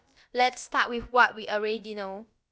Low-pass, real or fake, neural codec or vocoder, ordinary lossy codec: none; fake; codec, 16 kHz, about 1 kbps, DyCAST, with the encoder's durations; none